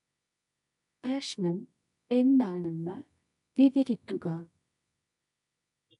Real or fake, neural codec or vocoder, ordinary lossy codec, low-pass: fake; codec, 24 kHz, 0.9 kbps, WavTokenizer, medium music audio release; none; 10.8 kHz